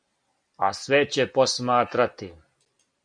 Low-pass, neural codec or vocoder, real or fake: 9.9 kHz; none; real